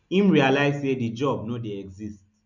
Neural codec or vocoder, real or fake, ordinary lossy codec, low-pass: none; real; none; 7.2 kHz